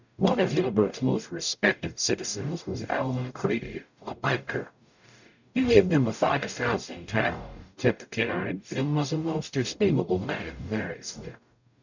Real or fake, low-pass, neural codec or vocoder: fake; 7.2 kHz; codec, 44.1 kHz, 0.9 kbps, DAC